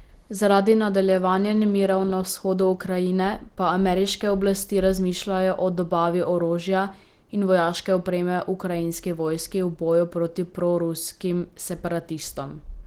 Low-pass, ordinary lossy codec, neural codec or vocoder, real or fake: 19.8 kHz; Opus, 16 kbps; none; real